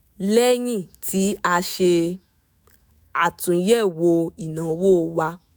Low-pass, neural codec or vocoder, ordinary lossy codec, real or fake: none; autoencoder, 48 kHz, 128 numbers a frame, DAC-VAE, trained on Japanese speech; none; fake